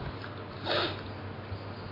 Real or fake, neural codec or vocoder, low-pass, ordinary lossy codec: real; none; 5.4 kHz; MP3, 24 kbps